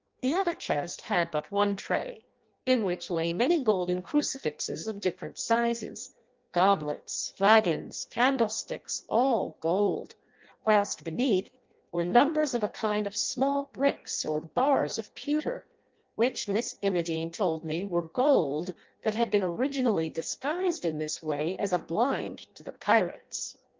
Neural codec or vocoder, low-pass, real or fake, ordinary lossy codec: codec, 16 kHz in and 24 kHz out, 0.6 kbps, FireRedTTS-2 codec; 7.2 kHz; fake; Opus, 32 kbps